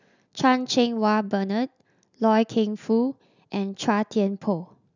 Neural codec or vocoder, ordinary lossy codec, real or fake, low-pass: none; none; real; 7.2 kHz